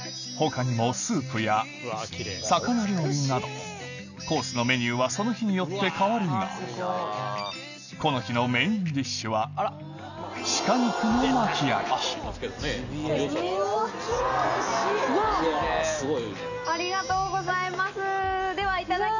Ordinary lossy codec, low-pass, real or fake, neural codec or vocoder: none; 7.2 kHz; real; none